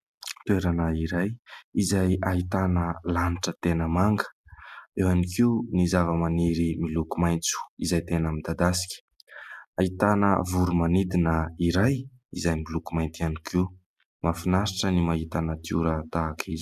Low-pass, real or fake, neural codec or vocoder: 14.4 kHz; real; none